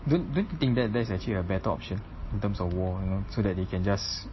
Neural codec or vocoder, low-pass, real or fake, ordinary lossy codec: none; 7.2 kHz; real; MP3, 24 kbps